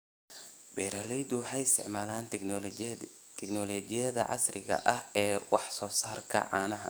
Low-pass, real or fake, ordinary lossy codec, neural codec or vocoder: none; fake; none; codec, 44.1 kHz, 7.8 kbps, DAC